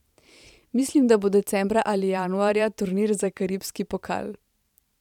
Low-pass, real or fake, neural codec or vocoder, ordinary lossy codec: 19.8 kHz; fake; vocoder, 44.1 kHz, 128 mel bands every 512 samples, BigVGAN v2; none